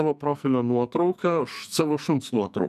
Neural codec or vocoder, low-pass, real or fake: codec, 44.1 kHz, 2.6 kbps, SNAC; 14.4 kHz; fake